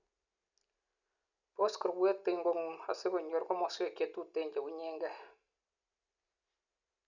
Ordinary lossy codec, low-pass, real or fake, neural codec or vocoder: none; 7.2 kHz; real; none